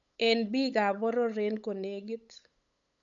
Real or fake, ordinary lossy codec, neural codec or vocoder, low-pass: fake; none; codec, 16 kHz, 8 kbps, FunCodec, trained on LibriTTS, 25 frames a second; 7.2 kHz